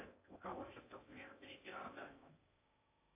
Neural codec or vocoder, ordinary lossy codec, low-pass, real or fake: codec, 16 kHz in and 24 kHz out, 0.6 kbps, FocalCodec, streaming, 4096 codes; MP3, 24 kbps; 3.6 kHz; fake